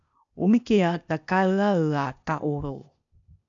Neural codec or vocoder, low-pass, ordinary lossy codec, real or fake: codec, 16 kHz, 0.8 kbps, ZipCodec; 7.2 kHz; MP3, 96 kbps; fake